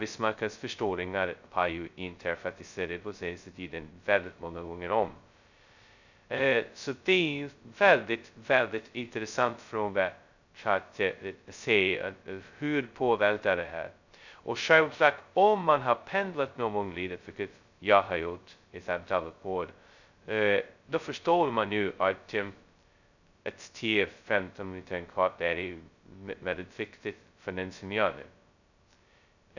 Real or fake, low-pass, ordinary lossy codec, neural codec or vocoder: fake; 7.2 kHz; none; codec, 16 kHz, 0.2 kbps, FocalCodec